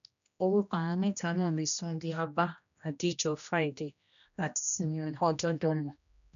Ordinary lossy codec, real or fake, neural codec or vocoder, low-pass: none; fake; codec, 16 kHz, 1 kbps, X-Codec, HuBERT features, trained on general audio; 7.2 kHz